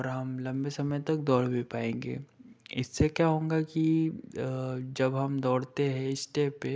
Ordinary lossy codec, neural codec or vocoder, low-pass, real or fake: none; none; none; real